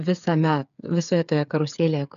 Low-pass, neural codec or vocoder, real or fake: 7.2 kHz; codec, 16 kHz, 8 kbps, FreqCodec, smaller model; fake